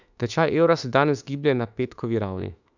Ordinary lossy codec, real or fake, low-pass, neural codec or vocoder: none; fake; 7.2 kHz; autoencoder, 48 kHz, 32 numbers a frame, DAC-VAE, trained on Japanese speech